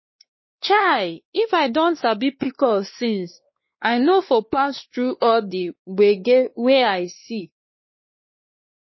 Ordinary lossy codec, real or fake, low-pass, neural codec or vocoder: MP3, 24 kbps; fake; 7.2 kHz; codec, 16 kHz, 2 kbps, X-Codec, WavLM features, trained on Multilingual LibriSpeech